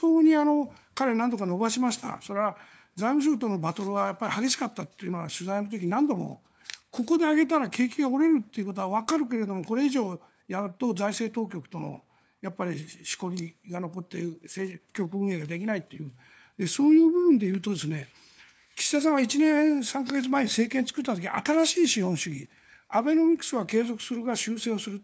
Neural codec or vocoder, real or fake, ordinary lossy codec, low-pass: codec, 16 kHz, 4 kbps, FunCodec, trained on LibriTTS, 50 frames a second; fake; none; none